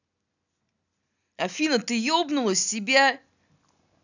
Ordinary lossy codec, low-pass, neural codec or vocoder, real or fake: none; 7.2 kHz; none; real